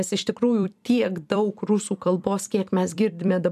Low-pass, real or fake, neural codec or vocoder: 14.4 kHz; fake; vocoder, 44.1 kHz, 128 mel bands every 256 samples, BigVGAN v2